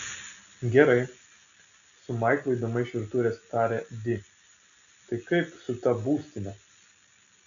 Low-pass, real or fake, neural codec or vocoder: 7.2 kHz; real; none